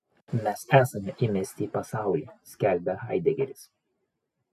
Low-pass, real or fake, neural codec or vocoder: 14.4 kHz; real; none